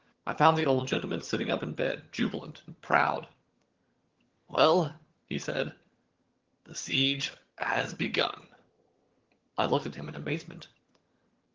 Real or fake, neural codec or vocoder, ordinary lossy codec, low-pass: fake; vocoder, 22.05 kHz, 80 mel bands, HiFi-GAN; Opus, 16 kbps; 7.2 kHz